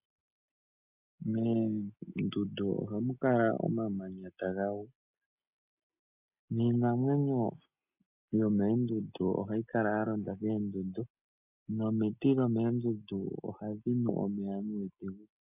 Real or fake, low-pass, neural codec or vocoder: real; 3.6 kHz; none